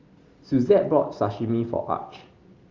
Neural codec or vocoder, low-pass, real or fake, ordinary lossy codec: none; 7.2 kHz; real; Opus, 32 kbps